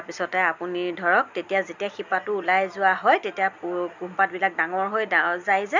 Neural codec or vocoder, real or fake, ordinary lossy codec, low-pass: none; real; none; 7.2 kHz